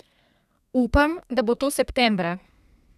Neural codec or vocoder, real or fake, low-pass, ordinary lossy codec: codec, 32 kHz, 1.9 kbps, SNAC; fake; 14.4 kHz; none